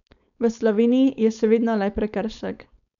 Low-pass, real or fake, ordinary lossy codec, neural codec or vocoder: 7.2 kHz; fake; none; codec, 16 kHz, 4.8 kbps, FACodec